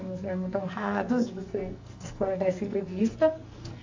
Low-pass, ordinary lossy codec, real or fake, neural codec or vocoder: 7.2 kHz; AAC, 48 kbps; fake; codec, 44.1 kHz, 2.6 kbps, SNAC